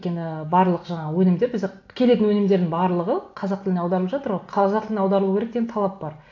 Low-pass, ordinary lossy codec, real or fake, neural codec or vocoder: 7.2 kHz; AAC, 48 kbps; real; none